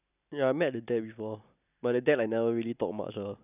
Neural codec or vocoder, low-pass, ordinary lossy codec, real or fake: none; 3.6 kHz; none; real